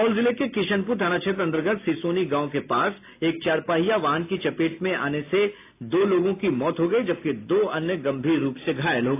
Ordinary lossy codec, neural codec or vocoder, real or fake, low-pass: AAC, 24 kbps; none; real; 3.6 kHz